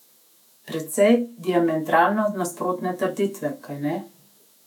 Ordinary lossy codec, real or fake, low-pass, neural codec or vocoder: none; fake; 19.8 kHz; autoencoder, 48 kHz, 128 numbers a frame, DAC-VAE, trained on Japanese speech